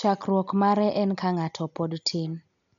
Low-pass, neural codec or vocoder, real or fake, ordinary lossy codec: 7.2 kHz; none; real; none